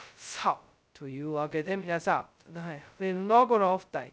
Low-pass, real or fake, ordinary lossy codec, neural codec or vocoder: none; fake; none; codec, 16 kHz, 0.2 kbps, FocalCodec